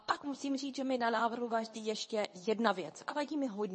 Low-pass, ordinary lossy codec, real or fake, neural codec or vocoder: 10.8 kHz; MP3, 32 kbps; fake; codec, 24 kHz, 0.9 kbps, WavTokenizer, medium speech release version 2